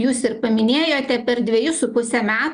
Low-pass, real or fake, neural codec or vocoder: 9.9 kHz; real; none